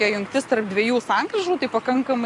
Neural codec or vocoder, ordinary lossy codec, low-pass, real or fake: none; AAC, 48 kbps; 10.8 kHz; real